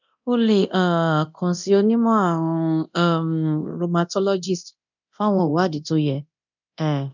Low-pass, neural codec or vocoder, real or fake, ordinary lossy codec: 7.2 kHz; codec, 24 kHz, 0.9 kbps, DualCodec; fake; none